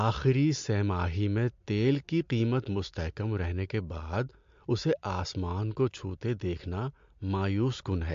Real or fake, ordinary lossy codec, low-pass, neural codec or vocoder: real; MP3, 48 kbps; 7.2 kHz; none